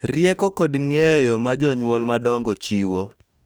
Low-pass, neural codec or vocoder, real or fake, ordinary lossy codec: none; codec, 44.1 kHz, 2.6 kbps, DAC; fake; none